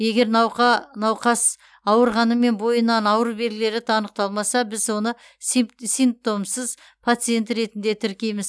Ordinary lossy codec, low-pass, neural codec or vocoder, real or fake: none; none; none; real